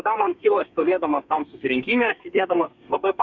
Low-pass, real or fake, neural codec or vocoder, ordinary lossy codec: 7.2 kHz; fake; codec, 32 kHz, 1.9 kbps, SNAC; Opus, 64 kbps